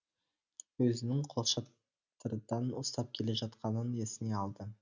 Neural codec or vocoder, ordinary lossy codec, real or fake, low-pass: none; none; real; 7.2 kHz